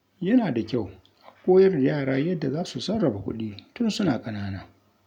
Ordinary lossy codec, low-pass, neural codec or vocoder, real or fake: none; 19.8 kHz; none; real